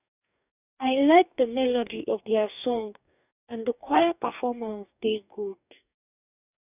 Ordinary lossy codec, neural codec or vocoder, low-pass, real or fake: none; codec, 44.1 kHz, 2.6 kbps, DAC; 3.6 kHz; fake